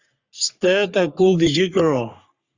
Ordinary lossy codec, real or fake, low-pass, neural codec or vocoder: Opus, 64 kbps; fake; 7.2 kHz; codec, 44.1 kHz, 3.4 kbps, Pupu-Codec